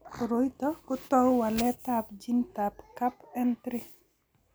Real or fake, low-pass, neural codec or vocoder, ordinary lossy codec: real; none; none; none